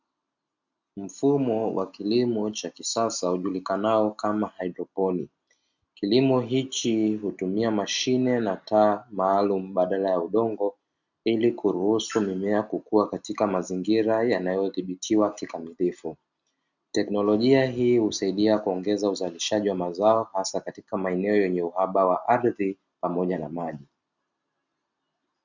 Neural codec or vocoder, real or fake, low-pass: none; real; 7.2 kHz